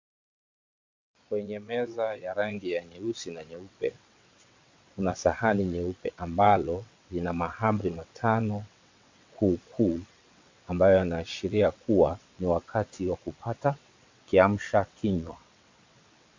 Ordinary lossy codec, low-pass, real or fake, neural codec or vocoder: MP3, 64 kbps; 7.2 kHz; fake; vocoder, 22.05 kHz, 80 mel bands, WaveNeXt